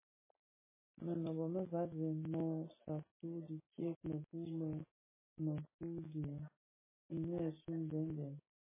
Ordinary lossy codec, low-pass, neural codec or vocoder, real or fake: MP3, 16 kbps; 3.6 kHz; none; real